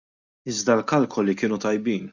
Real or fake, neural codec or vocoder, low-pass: real; none; 7.2 kHz